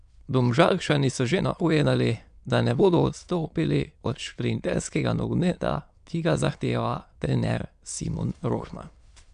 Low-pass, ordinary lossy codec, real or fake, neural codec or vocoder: 9.9 kHz; MP3, 96 kbps; fake; autoencoder, 22.05 kHz, a latent of 192 numbers a frame, VITS, trained on many speakers